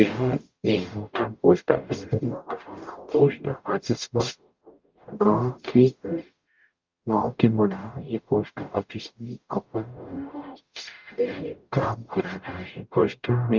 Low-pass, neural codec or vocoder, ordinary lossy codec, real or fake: 7.2 kHz; codec, 44.1 kHz, 0.9 kbps, DAC; Opus, 24 kbps; fake